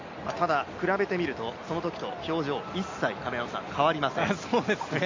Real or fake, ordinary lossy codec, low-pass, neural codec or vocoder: real; MP3, 64 kbps; 7.2 kHz; none